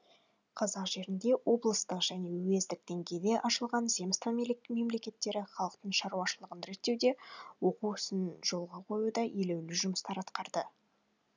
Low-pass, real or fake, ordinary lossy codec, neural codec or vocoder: 7.2 kHz; real; none; none